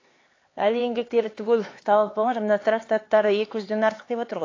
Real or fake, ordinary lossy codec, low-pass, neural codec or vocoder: fake; AAC, 32 kbps; 7.2 kHz; codec, 16 kHz, 4 kbps, X-Codec, HuBERT features, trained on LibriSpeech